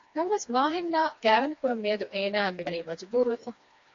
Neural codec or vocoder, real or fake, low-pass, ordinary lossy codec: codec, 16 kHz, 2 kbps, FreqCodec, smaller model; fake; 7.2 kHz; AAC, 48 kbps